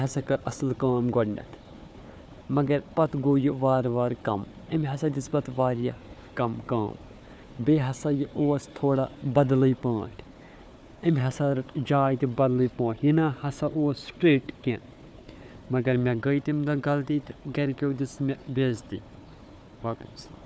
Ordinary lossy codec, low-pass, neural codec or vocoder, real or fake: none; none; codec, 16 kHz, 4 kbps, FunCodec, trained on Chinese and English, 50 frames a second; fake